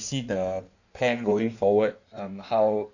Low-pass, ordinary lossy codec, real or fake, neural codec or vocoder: 7.2 kHz; none; fake; codec, 16 kHz in and 24 kHz out, 1.1 kbps, FireRedTTS-2 codec